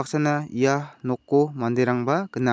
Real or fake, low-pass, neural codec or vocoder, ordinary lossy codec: real; none; none; none